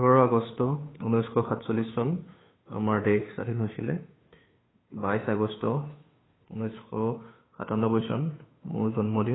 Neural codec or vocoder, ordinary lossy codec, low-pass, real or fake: codec, 16 kHz, 2 kbps, FunCodec, trained on Chinese and English, 25 frames a second; AAC, 16 kbps; 7.2 kHz; fake